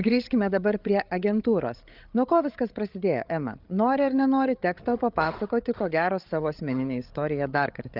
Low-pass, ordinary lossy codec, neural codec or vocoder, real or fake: 5.4 kHz; Opus, 24 kbps; codec, 16 kHz, 8 kbps, FreqCodec, larger model; fake